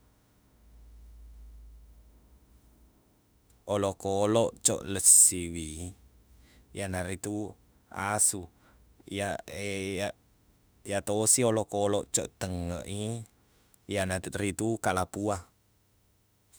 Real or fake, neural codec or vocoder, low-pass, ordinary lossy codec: fake; autoencoder, 48 kHz, 32 numbers a frame, DAC-VAE, trained on Japanese speech; none; none